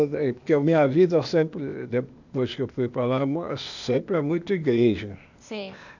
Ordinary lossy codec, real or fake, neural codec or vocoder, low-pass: none; fake; codec, 16 kHz, 0.8 kbps, ZipCodec; 7.2 kHz